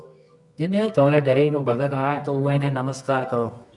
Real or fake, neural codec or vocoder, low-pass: fake; codec, 24 kHz, 0.9 kbps, WavTokenizer, medium music audio release; 10.8 kHz